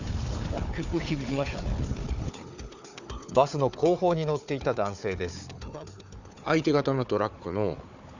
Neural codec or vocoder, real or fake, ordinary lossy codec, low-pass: codec, 16 kHz, 4 kbps, FunCodec, trained on Chinese and English, 50 frames a second; fake; none; 7.2 kHz